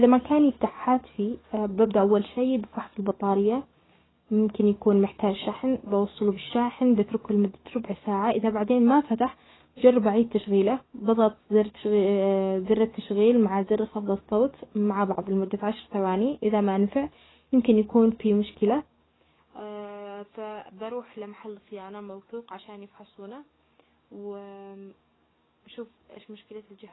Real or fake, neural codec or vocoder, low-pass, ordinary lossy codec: fake; codec, 44.1 kHz, 7.8 kbps, DAC; 7.2 kHz; AAC, 16 kbps